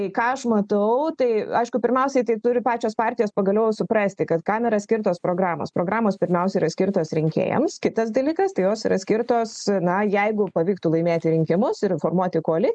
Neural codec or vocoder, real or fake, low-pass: none; real; 9.9 kHz